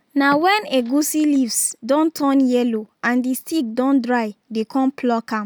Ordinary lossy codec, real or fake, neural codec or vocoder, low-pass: none; real; none; none